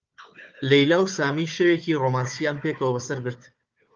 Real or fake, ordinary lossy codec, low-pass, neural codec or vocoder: fake; Opus, 24 kbps; 7.2 kHz; codec, 16 kHz, 4 kbps, FunCodec, trained on Chinese and English, 50 frames a second